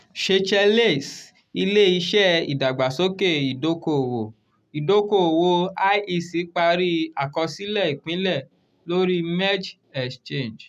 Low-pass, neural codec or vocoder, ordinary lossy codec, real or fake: 14.4 kHz; none; none; real